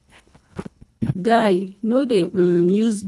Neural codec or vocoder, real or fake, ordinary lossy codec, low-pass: codec, 24 kHz, 1.5 kbps, HILCodec; fake; none; none